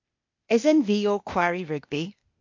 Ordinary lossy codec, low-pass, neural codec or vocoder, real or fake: MP3, 48 kbps; 7.2 kHz; codec, 16 kHz, 0.8 kbps, ZipCodec; fake